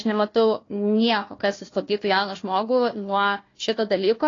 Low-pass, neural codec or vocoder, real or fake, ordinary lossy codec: 7.2 kHz; codec, 16 kHz, 1 kbps, FunCodec, trained on Chinese and English, 50 frames a second; fake; AAC, 32 kbps